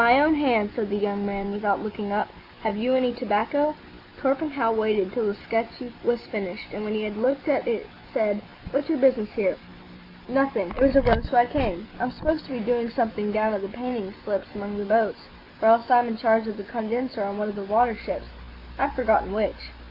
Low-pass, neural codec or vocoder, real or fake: 5.4 kHz; none; real